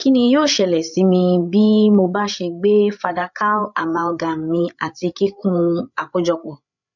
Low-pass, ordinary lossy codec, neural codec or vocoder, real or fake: 7.2 kHz; none; codec, 16 kHz, 8 kbps, FreqCodec, larger model; fake